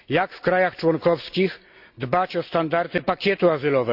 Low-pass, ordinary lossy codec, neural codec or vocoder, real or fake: 5.4 kHz; Opus, 64 kbps; none; real